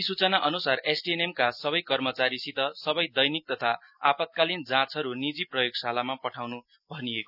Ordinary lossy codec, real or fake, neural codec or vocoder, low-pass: none; real; none; 5.4 kHz